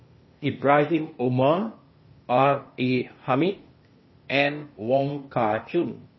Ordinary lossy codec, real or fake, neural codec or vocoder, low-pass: MP3, 24 kbps; fake; codec, 16 kHz, 0.8 kbps, ZipCodec; 7.2 kHz